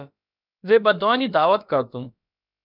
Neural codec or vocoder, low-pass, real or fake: codec, 16 kHz, about 1 kbps, DyCAST, with the encoder's durations; 5.4 kHz; fake